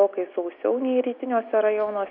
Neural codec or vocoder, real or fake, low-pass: none; real; 5.4 kHz